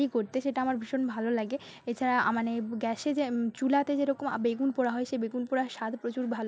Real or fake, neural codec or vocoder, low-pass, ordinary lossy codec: real; none; none; none